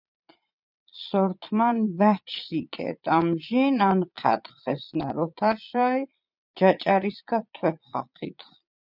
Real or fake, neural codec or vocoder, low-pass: fake; vocoder, 22.05 kHz, 80 mel bands, Vocos; 5.4 kHz